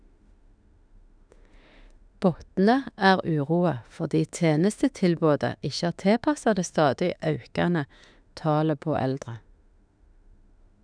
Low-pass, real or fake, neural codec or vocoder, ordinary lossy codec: 9.9 kHz; fake; autoencoder, 48 kHz, 32 numbers a frame, DAC-VAE, trained on Japanese speech; none